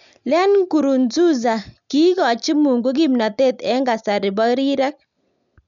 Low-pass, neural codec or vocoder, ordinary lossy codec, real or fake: 7.2 kHz; none; none; real